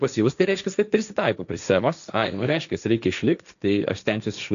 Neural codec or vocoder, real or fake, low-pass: codec, 16 kHz, 1.1 kbps, Voila-Tokenizer; fake; 7.2 kHz